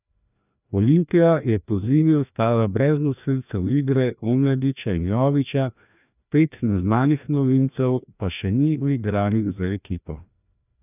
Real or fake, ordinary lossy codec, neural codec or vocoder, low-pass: fake; none; codec, 16 kHz, 1 kbps, FreqCodec, larger model; 3.6 kHz